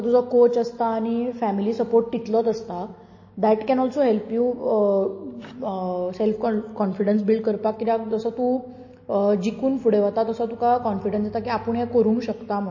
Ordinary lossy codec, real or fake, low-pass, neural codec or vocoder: MP3, 32 kbps; real; 7.2 kHz; none